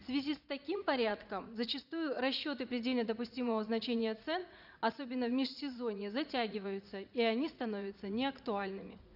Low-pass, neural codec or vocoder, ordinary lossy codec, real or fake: 5.4 kHz; vocoder, 44.1 kHz, 80 mel bands, Vocos; none; fake